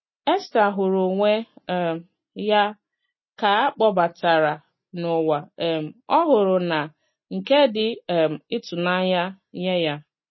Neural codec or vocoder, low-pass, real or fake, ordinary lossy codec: none; 7.2 kHz; real; MP3, 24 kbps